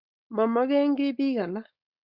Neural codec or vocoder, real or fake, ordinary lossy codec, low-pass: codec, 16 kHz, 4.8 kbps, FACodec; fake; Opus, 64 kbps; 5.4 kHz